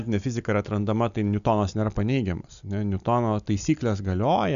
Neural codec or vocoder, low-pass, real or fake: none; 7.2 kHz; real